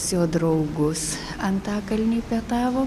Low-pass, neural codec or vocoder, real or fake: 14.4 kHz; none; real